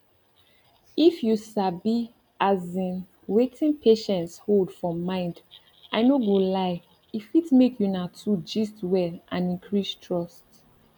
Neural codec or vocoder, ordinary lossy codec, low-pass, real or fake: none; none; 19.8 kHz; real